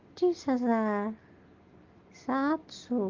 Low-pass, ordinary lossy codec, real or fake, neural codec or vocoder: 7.2 kHz; Opus, 24 kbps; real; none